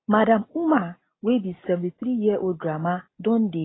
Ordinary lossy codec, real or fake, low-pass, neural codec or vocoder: AAC, 16 kbps; real; 7.2 kHz; none